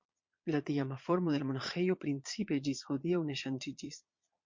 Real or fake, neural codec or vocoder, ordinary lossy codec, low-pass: fake; vocoder, 22.05 kHz, 80 mel bands, Vocos; MP3, 48 kbps; 7.2 kHz